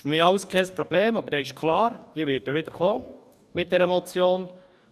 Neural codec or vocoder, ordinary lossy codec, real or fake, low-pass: codec, 44.1 kHz, 2.6 kbps, DAC; none; fake; 14.4 kHz